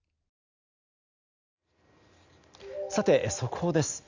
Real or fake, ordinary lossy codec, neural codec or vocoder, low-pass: real; Opus, 64 kbps; none; 7.2 kHz